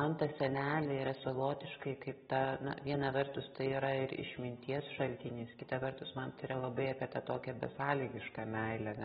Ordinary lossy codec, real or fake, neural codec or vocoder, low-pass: AAC, 16 kbps; real; none; 19.8 kHz